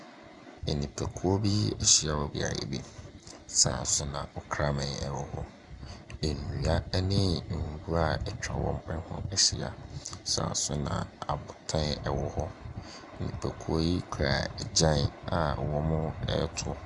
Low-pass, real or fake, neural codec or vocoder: 10.8 kHz; real; none